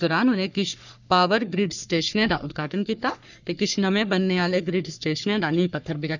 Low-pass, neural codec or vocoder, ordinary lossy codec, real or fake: 7.2 kHz; codec, 44.1 kHz, 3.4 kbps, Pupu-Codec; none; fake